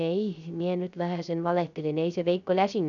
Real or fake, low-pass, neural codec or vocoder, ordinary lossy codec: fake; 7.2 kHz; codec, 16 kHz, 0.3 kbps, FocalCodec; none